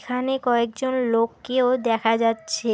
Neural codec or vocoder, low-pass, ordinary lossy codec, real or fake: none; none; none; real